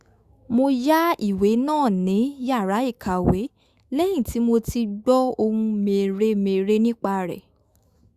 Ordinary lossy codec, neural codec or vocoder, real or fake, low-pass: Opus, 64 kbps; autoencoder, 48 kHz, 128 numbers a frame, DAC-VAE, trained on Japanese speech; fake; 14.4 kHz